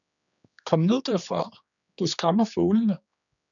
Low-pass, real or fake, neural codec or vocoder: 7.2 kHz; fake; codec, 16 kHz, 2 kbps, X-Codec, HuBERT features, trained on general audio